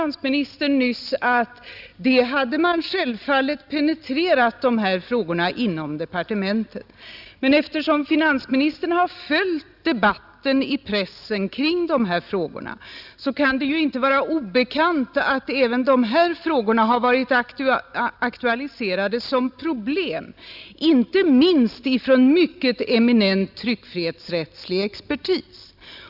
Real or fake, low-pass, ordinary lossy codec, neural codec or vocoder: real; 5.4 kHz; Opus, 64 kbps; none